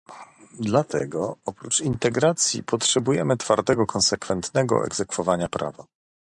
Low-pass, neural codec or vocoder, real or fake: 10.8 kHz; vocoder, 44.1 kHz, 128 mel bands every 512 samples, BigVGAN v2; fake